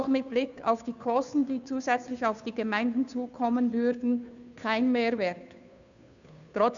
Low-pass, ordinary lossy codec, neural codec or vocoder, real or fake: 7.2 kHz; none; codec, 16 kHz, 2 kbps, FunCodec, trained on Chinese and English, 25 frames a second; fake